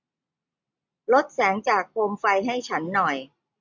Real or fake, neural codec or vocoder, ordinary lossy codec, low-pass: real; none; MP3, 64 kbps; 7.2 kHz